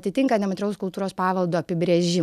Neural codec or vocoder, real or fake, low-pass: none; real; 14.4 kHz